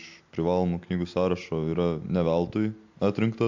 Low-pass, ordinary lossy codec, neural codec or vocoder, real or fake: 7.2 kHz; MP3, 64 kbps; none; real